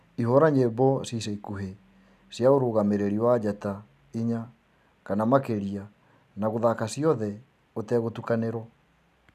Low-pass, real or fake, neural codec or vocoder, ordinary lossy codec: 14.4 kHz; real; none; none